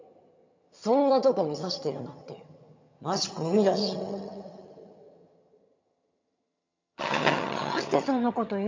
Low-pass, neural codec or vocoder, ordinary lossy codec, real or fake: 7.2 kHz; vocoder, 22.05 kHz, 80 mel bands, HiFi-GAN; MP3, 48 kbps; fake